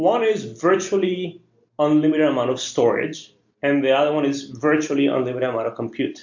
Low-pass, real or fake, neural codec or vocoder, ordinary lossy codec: 7.2 kHz; real; none; MP3, 48 kbps